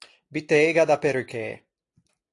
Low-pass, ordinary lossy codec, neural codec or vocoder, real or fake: 10.8 kHz; AAC, 48 kbps; none; real